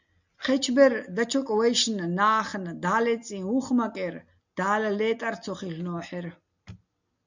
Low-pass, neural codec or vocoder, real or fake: 7.2 kHz; none; real